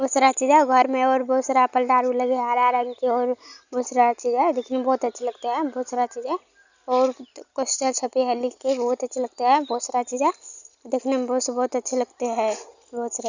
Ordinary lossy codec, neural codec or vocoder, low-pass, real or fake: none; none; 7.2 kHz; real